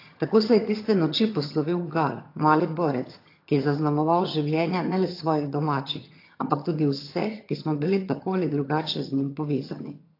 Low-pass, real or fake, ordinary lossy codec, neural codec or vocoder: 5.4 kHz; fake; AAC, 32 kbps; vocoder, 22.05 kHz, 80 mel bands, HiFi-GAN